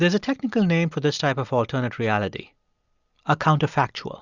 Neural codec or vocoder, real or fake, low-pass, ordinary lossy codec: none; real; 7.2 kHz; Opus, 64 kbps